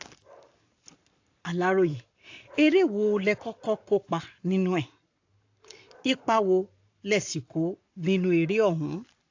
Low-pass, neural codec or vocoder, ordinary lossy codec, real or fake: 7.2 kHz; codec, 44.1 kHz, 7.8 kbps, Pupu-Codec; AAC, 48 kbps; fake